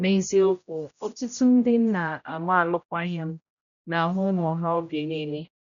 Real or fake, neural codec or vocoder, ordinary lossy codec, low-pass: fake; codec, 16 kHz, 0.5 kbps, X-Codec, HuBERT features, trained on general audio; none; 7.2 kHz